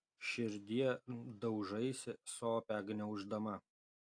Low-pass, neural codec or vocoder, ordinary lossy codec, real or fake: 9.9 kHz; none; AAC, 64 kbps; real